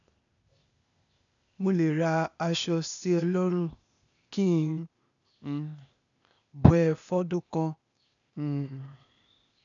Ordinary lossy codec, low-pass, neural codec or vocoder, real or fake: none; 7.2 kHz; codec, 16 kHz, 0.8 kbps, ZipCodec; fake